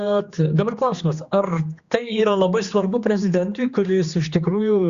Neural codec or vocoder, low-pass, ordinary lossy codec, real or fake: codec, 16 kHz, 2 kbps, X-Codec, HuBERT features, trained on general audio; 7.2 kHz; Opus, 64 kbps; fake